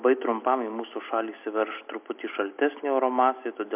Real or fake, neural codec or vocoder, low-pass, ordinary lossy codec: real; none; 3.6 kHz; MP3, 32 kbps